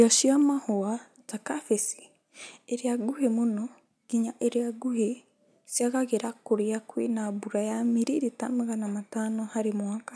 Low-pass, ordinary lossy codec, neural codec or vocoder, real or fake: none; none; none; real